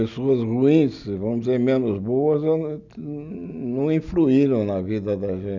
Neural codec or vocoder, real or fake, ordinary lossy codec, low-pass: none; real; none; 7.2 kHz